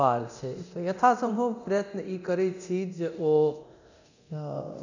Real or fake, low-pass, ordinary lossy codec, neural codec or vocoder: fake; 7.2 kHz; none; codec, 24 kHz, 0.9 kbps, DualCodec